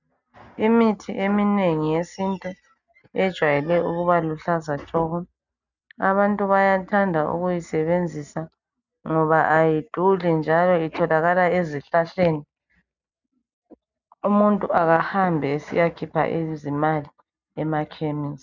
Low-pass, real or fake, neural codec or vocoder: 7.2 kHz; real; none